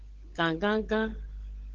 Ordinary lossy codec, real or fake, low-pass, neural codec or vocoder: Opus, 16 kbps; fake; 7.2 kHz; codec, 16 kHz, 16 kbps, FunCodec, trained on LibriTTS, 50 frames a second